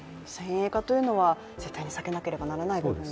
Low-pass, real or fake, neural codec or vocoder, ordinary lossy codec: none; real; none; none